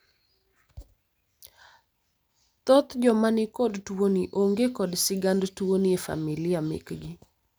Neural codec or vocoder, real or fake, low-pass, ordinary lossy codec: none; real; none; none